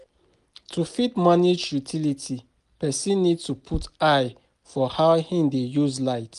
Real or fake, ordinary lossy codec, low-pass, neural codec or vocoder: real; Opus, 32 kbps; 10.8 kHz; none